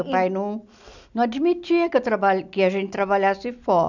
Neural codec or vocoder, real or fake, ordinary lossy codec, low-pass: none; real; none; 7.2 kHz